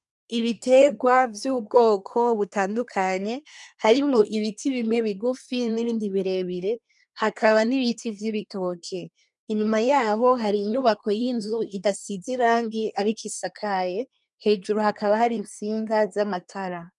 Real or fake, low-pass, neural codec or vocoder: fake; 10.8 kHz; codec, 24 kHz, 1 kbps, SNAC